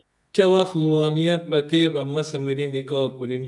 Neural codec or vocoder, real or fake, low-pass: codec, 24 kHz, 0.9 kbps, WavTokenizer, medium music audio release; fake; 10.8 kHz